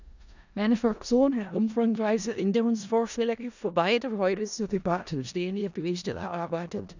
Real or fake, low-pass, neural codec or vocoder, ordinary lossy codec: fake; 7.2 kHz; codec, 16 kHz in and 24 kHz out, 0.4 kbps, LongCat-Audio-Codec, four codebook decoder; none